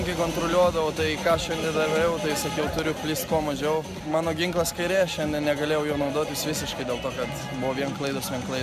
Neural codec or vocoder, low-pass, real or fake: none; 14.4 kHz; real